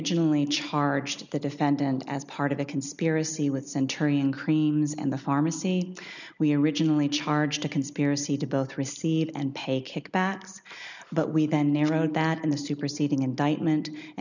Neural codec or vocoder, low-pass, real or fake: none; 7.2 kHz; real